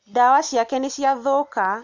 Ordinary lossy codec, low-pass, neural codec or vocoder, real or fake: MP3, 64 kbps; 7.2 kHz; none; real